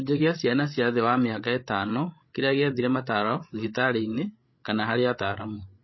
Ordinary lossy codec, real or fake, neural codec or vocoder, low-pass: MP3, 24 kbps; fake; codec, 16 kHz, 16 kbps, FreqCodec, larger model; 7.2 kHz